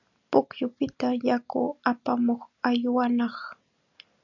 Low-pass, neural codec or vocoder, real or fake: 7.2 kHz; none; real